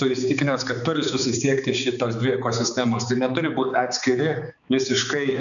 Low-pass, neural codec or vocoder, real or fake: 7.2 kHz; codec, 16 kHz, 4 kbps, X-Codec, HuBERT features, trained on balanced general audio; fake